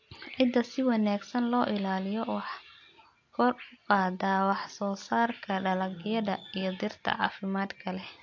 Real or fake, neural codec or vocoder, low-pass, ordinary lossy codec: real; none; 7.2 kHz; AAC, 48 kbps